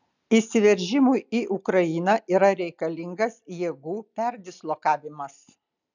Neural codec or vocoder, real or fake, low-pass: none; real; 7.2 kHz